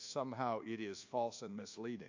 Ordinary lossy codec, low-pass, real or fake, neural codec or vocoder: AAC, 48 kbps; 7.2 kHz; fake; codec, 24 kHz, 1.2 kbps, DualCodec